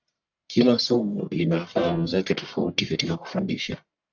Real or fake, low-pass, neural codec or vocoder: fake; 7.2 kHz; codec, 44.1 kHz, 1.7 kbps, Pupu-Codec